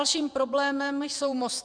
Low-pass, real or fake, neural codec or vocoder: 9.9 kHz; fake; vocoder, 48 kHz, 128 mel bands, Vocos